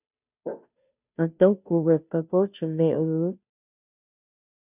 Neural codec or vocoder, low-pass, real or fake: codec, 16 kHz, 0.5 kbps, FunCodec, trained on Chinese and English, 25 frames a second; 3.6 kHz; fake